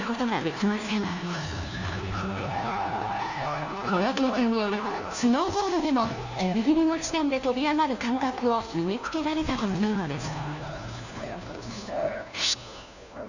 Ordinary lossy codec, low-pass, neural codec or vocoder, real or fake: none; 7.2 kHz; codec, 16 kHz, 1 kbps, FunCodec, trained on LibriTTS, 50 frames a second; fake